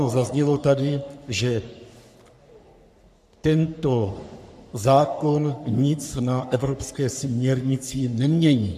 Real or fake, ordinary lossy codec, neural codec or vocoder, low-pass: fake; MP3, 96 kbps; codec, 44.1 kHz, 3.4 kbps, Pupu-Codec; 14.4 kHz